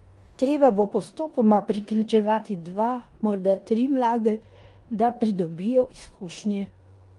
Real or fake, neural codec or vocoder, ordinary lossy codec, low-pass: fake; codec, 16 kHz in and 24 kHz out, 0.9 kbps, LongCat-Audio-Codec, four codebook decoder; Opus, 32 kbps; 10.8 kHz